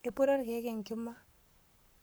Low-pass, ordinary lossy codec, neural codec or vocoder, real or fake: none; none; codec, 44.1 kHz, 7.8 kbps, Pupu-Codec; fake